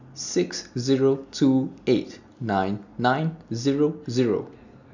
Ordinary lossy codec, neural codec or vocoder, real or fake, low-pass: none; none; real; 7.2 kHz